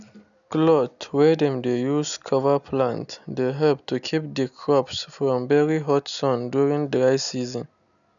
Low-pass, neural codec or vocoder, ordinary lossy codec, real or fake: 7.2 kHz; none; none; real